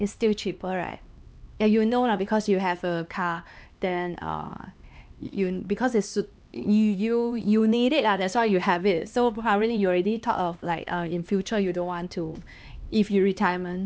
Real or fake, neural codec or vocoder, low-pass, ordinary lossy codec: fake; codec, 16 kHz, 2 kbps, X-Codec, HuBERT features, trained on LibriSpeech; none; none